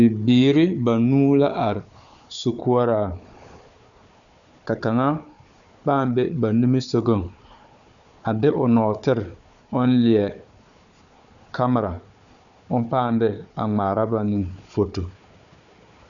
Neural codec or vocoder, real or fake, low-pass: codec, 16 kHz, 4 kbps, FunCodec, trained on Chinese and English, 50 frames a second; fake; 7.2 kHz